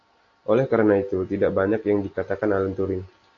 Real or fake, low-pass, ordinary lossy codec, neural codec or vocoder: real; 7.2 kHz; AAC, 48 kbps; none